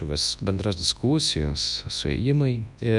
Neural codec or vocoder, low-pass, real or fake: codec, 24 kHz, 0.9 kbps, WavTokenizer, large speech release; 10.8 kHz; fake